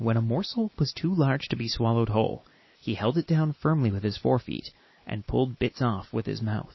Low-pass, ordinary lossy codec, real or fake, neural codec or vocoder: 7.2 kHz; MP3, 24 kbps; real; none